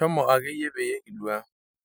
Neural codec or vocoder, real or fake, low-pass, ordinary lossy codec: vocoder, 44.1 kHz, 128 mel bands every 256 samples, BigVGAN v2; fake; none; none